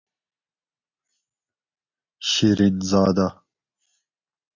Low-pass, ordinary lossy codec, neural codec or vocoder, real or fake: 7.2 kHz; MP3, 48 kbps; none; real